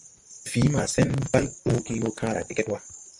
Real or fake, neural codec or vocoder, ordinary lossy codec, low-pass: fake; vocoder, 44.1 kHz, 128 mel bands, Pupu-Vocoder; MP3, 64 kbps; 10.8 kHz